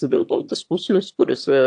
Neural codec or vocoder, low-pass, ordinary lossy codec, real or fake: autoencoder, 22.05 kHz, a latent of 192 numbers a frame, VITS, trained on one speaker; 9.9 kHz; AAC, 64 kbps; fake